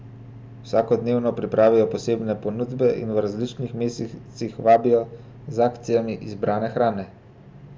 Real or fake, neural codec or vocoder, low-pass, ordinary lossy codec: real; none; none; none